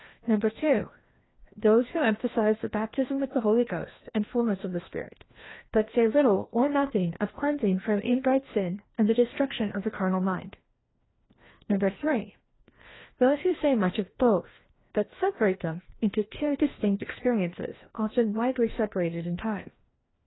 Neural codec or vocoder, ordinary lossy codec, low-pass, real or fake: codec, 16 kHz, 1 kbps, FreqCodec, larger model; AAC, 16 kbps; 7.2 kHz; fake